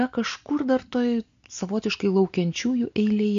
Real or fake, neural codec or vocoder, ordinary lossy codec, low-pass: real; none; MP3, 48 kbps; 7.2 kHz